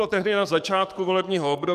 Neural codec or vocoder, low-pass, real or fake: codec, 44.1 kHz, 7.8 kbps, DAC; 14.4 kHz; fake